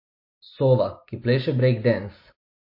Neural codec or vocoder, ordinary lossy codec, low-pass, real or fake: none; MP3, 32 kbps; 5.4 kHz; real